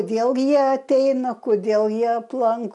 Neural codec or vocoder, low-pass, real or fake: none; 10.8 kHz; real